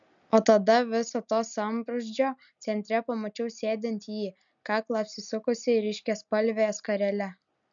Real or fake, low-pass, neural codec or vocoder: real; 7.2 kHz; none